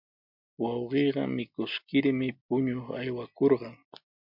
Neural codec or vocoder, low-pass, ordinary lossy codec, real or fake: none; 5.4 kHz; MP3, 48 kbps; real